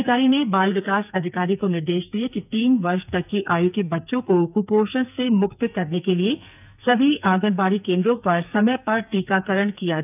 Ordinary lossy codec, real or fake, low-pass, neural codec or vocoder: none; fake; 3.6 kHz; codec, 44.1 kHz, 2.6 kbps, SNAC